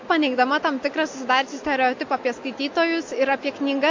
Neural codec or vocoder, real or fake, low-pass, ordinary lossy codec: none; real; 7.2 kHz; MP3, 48 kbps